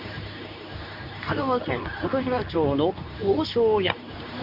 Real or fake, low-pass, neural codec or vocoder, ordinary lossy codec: fake; 5.4 kHz; codec, 24 kHz, 0.9 kbps, WavTokenizer, medium speech release version 2; none